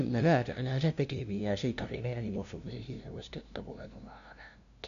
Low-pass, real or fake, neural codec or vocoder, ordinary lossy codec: 7.2 kHz; fake; codec, 16 kHz, 0.5 kbps, FunCodec, trained on LibriTTS, 25 frames a second; none